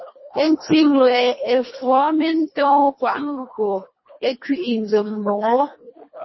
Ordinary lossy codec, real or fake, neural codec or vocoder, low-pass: MP3, 24 kbps; fake; codec, 24 kHz, 1.5 kbps, HILCodec; 7.2 kHz